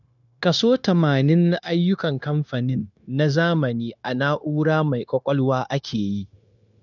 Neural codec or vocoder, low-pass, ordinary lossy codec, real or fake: codec, 16 kHz, 0.9 kbps, LongCat-Audio-Codec; 7.2 kHz; none; fake